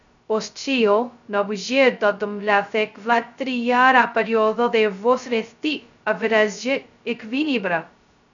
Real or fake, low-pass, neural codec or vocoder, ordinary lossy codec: fake; 7.2 kHz; codec, 16 kHz, 0.2 kbps, FocalCodec; MP3, 96 kbps